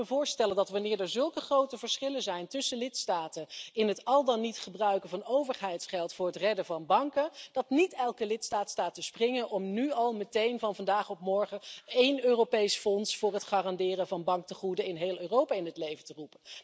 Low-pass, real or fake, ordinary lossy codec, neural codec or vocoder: none; real; none; none